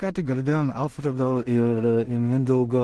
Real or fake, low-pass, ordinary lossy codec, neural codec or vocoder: fake; 10.8 kHz; Opus, 16 kbps; codec, 16 kHz in and 24 kHz out, 0.4 kbps, LongCat-Audio-Codec, two codebook decoder